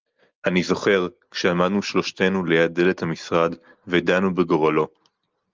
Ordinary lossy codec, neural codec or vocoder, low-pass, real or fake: Opus, 24 kbps; none; 7.2 kHz; real